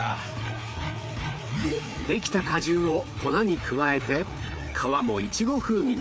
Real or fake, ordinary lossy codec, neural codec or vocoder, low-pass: fake; none; codec, 16 kHz, 4 kbps, FreqCodec, larger model; none